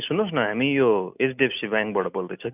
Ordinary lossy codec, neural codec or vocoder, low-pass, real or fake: none; none; 3.6 kHz; real